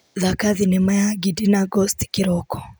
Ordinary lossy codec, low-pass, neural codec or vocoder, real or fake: none; none; none; real